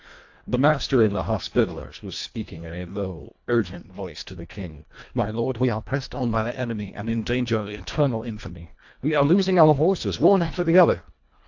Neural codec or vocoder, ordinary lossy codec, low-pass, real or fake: codec, 24 kHz, 1.5 kbps, HILCodec; AAC, 48 kbps; 7.2 kHz; fake